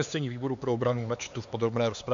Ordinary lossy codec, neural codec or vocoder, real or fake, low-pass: AAC, 64 kbps; codec, 16 kHz, 4 kbps, X-Codec, HuBERT features, trained on LibriSpeech; fake; 7.2 kHz